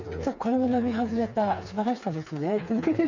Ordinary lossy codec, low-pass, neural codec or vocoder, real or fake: none; 7.2 kHz; codec, 16 kHz, 4 kbps, FreqCodec, smaller model; fake